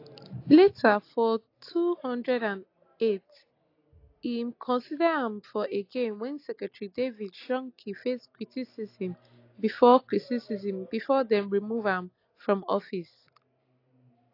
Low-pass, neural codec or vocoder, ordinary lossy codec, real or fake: 5.4 kHz; none; AAC, 32 kbps; real